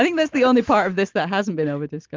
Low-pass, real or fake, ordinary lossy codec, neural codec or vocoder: 7.2 kHz; real; Opus, 32 kbps; none